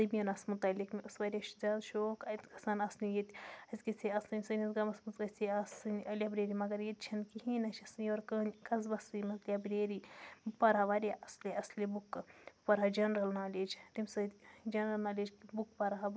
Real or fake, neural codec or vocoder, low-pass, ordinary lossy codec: real; none; none; none